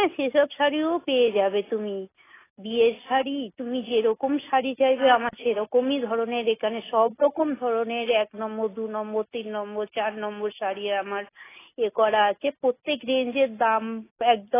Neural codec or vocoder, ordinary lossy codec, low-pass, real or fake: none; AAC, 16 kbps; 3.6 kHz; real